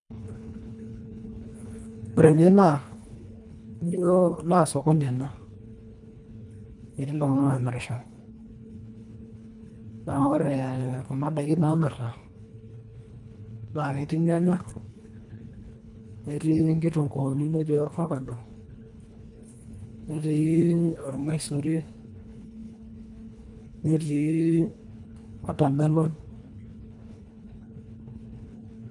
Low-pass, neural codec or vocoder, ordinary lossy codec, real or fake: 10.8 kHz; codec, 24 kHz, 1.5 kbps, HILCodec; none; fake